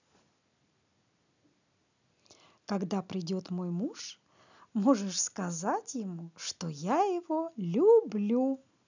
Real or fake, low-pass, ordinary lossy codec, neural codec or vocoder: real; 7.2 kHz; none; none